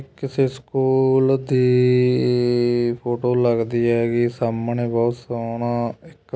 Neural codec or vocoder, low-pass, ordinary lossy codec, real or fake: none; none; none; real